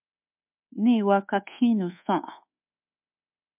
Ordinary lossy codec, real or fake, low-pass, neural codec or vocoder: MP3, 32 kbps; fake; 3.6 kHz; codec, 24 kHz, 1.2 kbps, DualCodec